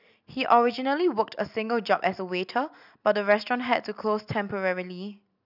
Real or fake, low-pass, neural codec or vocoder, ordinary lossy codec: real; 5.4 kHz; none; none